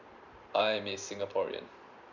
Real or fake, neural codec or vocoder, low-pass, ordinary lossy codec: fake; vocoder, 44.1 kHz, 128 mel bands every 512 samples, BigVGAN v2; 7.2 kHz; none